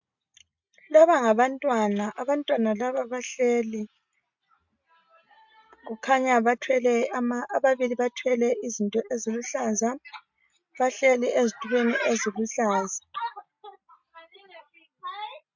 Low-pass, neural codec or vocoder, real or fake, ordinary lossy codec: 7.2 kHz; none; real; MP3, 64 kbps